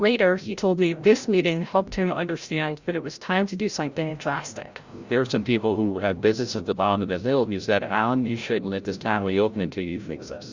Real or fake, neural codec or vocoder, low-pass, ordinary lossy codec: fake; codec, 16 kHz, 0.5 kbps, FreqCodec, larger model; 7.2 kHz; Opus, 64 kbps